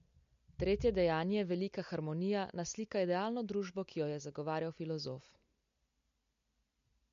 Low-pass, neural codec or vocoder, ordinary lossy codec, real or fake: 7.2 kHz; none; MP3, 48 kbps; real